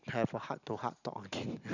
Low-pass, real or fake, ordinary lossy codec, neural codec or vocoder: 7.2 kHz; real; none; none